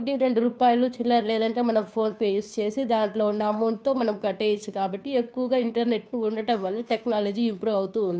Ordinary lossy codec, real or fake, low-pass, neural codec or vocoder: none; fake; none; codec, 16 kHz, 2 kbps, FunCodec, trained on Chinese and English, 25 frames a second